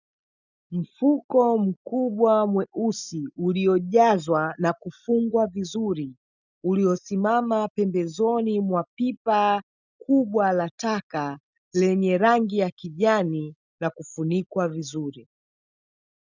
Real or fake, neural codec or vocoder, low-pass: real; none; 7.2 kHz